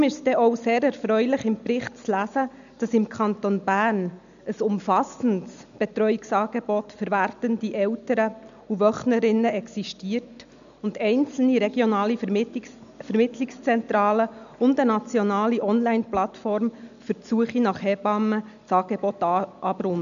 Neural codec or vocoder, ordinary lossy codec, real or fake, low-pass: none; none; real; 7.2 kHz